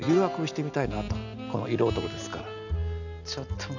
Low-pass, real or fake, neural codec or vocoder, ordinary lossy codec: 7.2 kHz; real; none; none